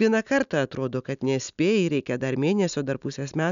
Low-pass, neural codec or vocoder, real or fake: 7.2 kHz; none; real